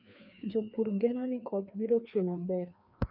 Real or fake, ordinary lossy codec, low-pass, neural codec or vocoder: fake; none; 5.4 kHz; codec, 16 kHz, 2 kbps, FreqCodec, larger model